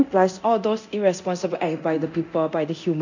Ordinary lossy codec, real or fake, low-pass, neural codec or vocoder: none; fake; 7.2 kHz; codec, 24 kHz, 0.9 kbps, DualCodec